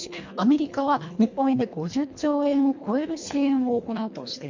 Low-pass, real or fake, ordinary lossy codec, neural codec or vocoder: 7.2 kHz; fake; MP3, 48 kbps; codec, 24 kHz, 1.5 kbps, HILCodec